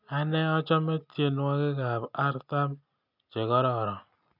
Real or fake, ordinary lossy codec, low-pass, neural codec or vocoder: real; none; 5.4 kHz; none